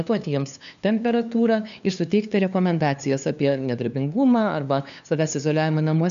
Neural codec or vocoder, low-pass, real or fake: codec, 16 kHz, 2 kbps, FunCodec, trained on LibriTTS, 25 frames a second; 7.2 kHz; fake